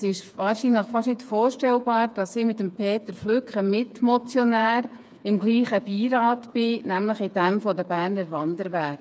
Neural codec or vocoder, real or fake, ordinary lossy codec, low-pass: codec, 16 kHz, 4 kbps, FreqCodec, smaller model; fake; none; none